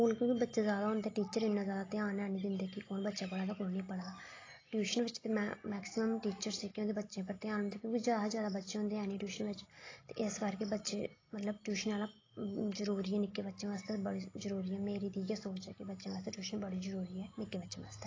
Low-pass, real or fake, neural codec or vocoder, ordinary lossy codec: 7.2 kHz; real; none; AAC, 32 kbps